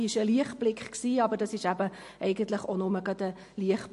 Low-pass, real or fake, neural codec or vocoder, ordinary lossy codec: 14.4 kHz; real; none; MP3, 48 kbps